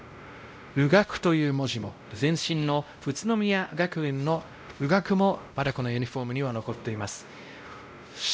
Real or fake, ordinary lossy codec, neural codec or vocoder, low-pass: fake; none; codec, 16 kHz, 0.5 kbps, X-Codec, WavLM features, trained on Multilingual LibriSpeech; none